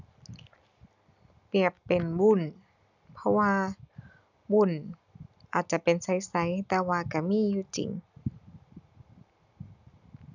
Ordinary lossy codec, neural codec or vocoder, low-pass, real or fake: none; none; 7.2 kHz; real